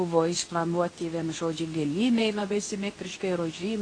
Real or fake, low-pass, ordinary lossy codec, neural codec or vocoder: fake; 9.9 kHz; AAC, 32 kbps; codec, 24 kHz, 0.9 kbps, WavTokenizer, medium speech release version 2